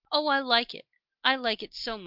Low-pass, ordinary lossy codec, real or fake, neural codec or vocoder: 5.4 kHz; Opus, 32 kbps; real; none